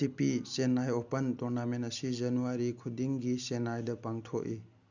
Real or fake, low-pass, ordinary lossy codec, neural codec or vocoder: real; 7.2 kHz; none; none